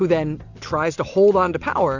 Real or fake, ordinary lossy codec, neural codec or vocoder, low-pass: real; Opus, 64 kbps; none; 7.2 kHz